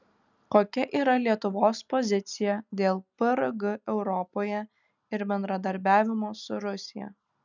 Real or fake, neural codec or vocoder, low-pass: real; none; 7.2 kHz